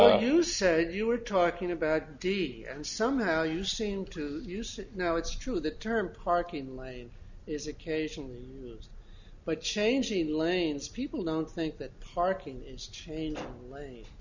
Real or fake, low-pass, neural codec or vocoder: real; 7.2 kHz; none